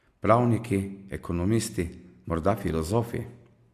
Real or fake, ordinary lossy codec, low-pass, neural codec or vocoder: real; Opus, 64 kbps; 14.4 kHz; none